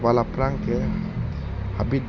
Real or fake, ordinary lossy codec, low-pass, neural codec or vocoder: real; none; 7.2 kHz; none